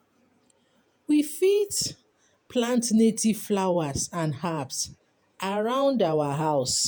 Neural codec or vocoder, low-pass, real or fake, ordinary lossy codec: vocoder, 48 kHz, 128 mel bands, Vocos; none; fake; none